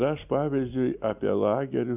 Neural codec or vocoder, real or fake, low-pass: none; real; 3.6 kHz